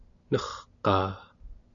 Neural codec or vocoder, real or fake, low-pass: none; real; 7.2 kHz